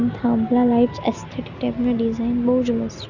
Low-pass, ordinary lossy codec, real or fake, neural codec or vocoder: 7.2 kHz; none; real; none